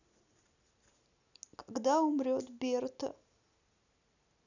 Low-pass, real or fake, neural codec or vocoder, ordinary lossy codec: 7.2 kHz; real; none; none